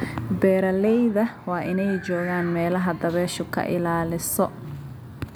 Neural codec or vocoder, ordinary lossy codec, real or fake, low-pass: none; none; real; none